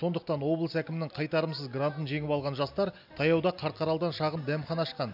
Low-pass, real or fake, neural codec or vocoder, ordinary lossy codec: 5.4 kHz; real; none; none